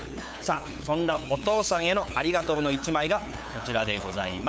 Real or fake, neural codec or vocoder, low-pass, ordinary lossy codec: fake; codec, 16 kHz, 8 kbps, FunCodec, trained on LibriTTS, 25 frames a second; none; none